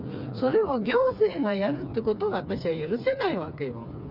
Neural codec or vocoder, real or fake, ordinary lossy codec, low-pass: codec, 16 kHz, 4 kbps, FreqCodec, smaller model; fake; none; 5.4 kHz